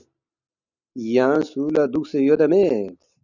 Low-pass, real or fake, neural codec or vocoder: 7.2 kHz; real; none